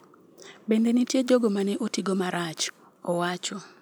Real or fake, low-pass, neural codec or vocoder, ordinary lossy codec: real; none; none; none